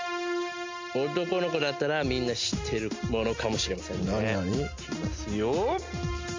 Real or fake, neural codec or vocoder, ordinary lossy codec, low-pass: real; none; MP3, 64 kbps; 7.2 kHz